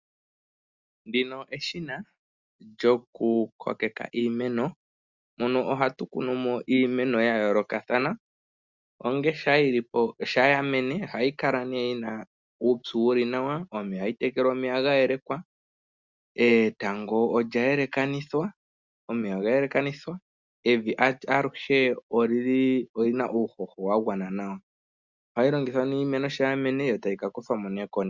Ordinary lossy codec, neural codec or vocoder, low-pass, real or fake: Opus, 64 kbps; none; 7.2 kHz; real